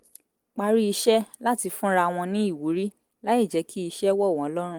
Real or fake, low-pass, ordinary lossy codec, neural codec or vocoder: real; 19.8 kHz; Opus, 24 kbps; none